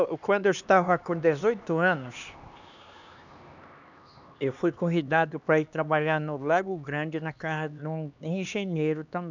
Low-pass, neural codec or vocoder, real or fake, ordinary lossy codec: 7.2 kHz; codec, 16 kHz, 2 kbps, X-Codec, HuBERT features, trained on LibriSpeech; fake; none